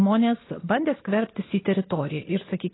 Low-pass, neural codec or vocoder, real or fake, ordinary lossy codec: 7.2 kHz; none; real; AAC, 16 kbps